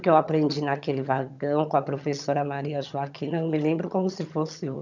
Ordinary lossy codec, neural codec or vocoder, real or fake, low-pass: none; vocoder, 22.05 kHz, 80 mel bands, HiFi-GAN; fake; 7.2 kHz